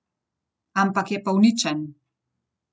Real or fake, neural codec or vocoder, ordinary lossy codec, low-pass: real; none; none; none